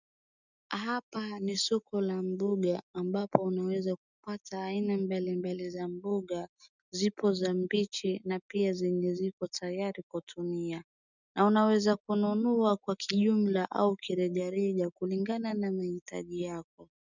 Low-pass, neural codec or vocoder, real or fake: 7.2 kHz; none; real